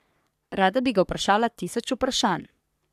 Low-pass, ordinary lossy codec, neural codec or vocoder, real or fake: 14.4 kHz; none; codec, 44.1 kHz, 3.4 kbps, Pupu-Codec; fake